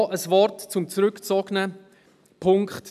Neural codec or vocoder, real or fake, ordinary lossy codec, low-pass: none; real; none; 14.4 kHz